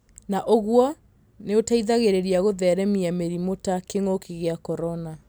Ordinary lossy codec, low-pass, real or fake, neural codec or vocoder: none; none; real; none